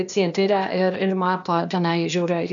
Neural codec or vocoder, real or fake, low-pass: codec, 16 kHz, 0.8 kbps, ZipCodec; fake; 7.2 kHz